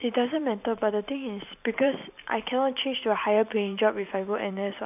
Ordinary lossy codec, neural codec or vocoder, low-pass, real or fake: none; vocoder, 44.1 kHz, 128 mel bands every 512 samples, BigVGAN v2; 3.6 kHz; fake